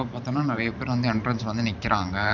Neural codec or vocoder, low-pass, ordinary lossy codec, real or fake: vocoder, 44.1 kHz, 128 mel bands every 512 samples, BigVGAN v2; 7.2 kHz; none; fake